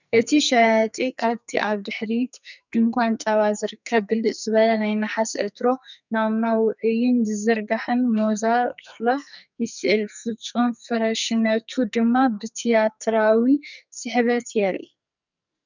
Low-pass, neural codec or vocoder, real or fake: 7.2 kHz; codec, 44.1 kHz, 2.6 kbps, SNAC; fake